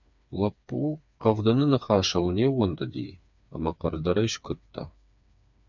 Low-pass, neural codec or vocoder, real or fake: 7.2 kHz; codec, 16 kHz, 4 kbps, FreqCodec, smaller model; fake